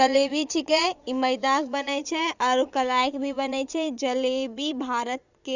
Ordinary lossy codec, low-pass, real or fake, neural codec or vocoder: Opus, 64 kbps; 7.2 kHz; fake; vocoder, 22.05 kHz, 80 mel bands, Vocos